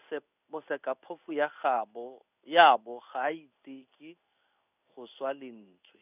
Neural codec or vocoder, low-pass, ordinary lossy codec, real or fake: codec, 16 kHz in and 24 kHz out, 1 kbps, XY-Tokenizer; 3.6 kHz; none; fake